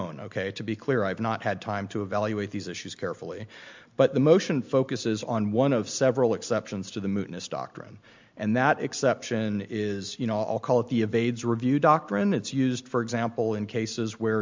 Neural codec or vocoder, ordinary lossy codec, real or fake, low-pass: none; MP3, 64 kbps; real; 7.2 kHz